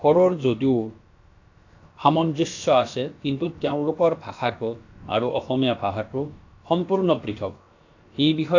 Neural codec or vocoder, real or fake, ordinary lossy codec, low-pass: codec, 16 kHz, about 1 kbps, DyCAST, with the encoder's durations; fake; AAC, 48 kbps; 7.2 kHz